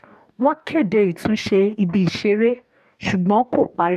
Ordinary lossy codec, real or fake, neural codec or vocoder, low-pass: none; fake; codec, 44.1 kHz, 2.6 kbps, DAC; 14.4 kHz